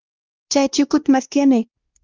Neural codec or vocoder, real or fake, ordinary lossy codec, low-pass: codec, 16 kHz, 1 kbps, X-Codec, WavLM features, trained on Multilingual LibriSpeech; fake; Opus, 24 kbps; 7.2 kHz